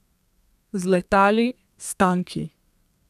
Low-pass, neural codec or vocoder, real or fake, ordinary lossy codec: 14.4 kHz; codec, 32 kHz, 1.9 kbps, SNAC; fake; none